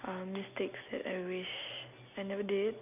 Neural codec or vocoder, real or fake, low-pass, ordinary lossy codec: none; real; 3.6 kHz; none